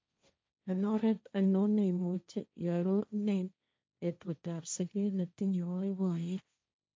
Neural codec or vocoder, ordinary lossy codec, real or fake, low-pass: codec, 16 kHz, 1.1 kbps, Voila-Tokenizer; none; fake; none